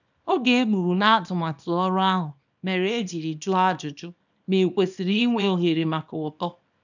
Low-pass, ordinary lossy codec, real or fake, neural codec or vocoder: 7.2 kHz; none; fake; codec, 24 kHz, 0.9 kbps, WavTokenizer, small release